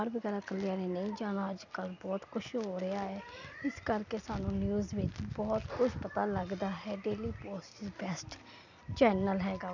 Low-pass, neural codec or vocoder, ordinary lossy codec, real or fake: 7.2 kHz; none; none; real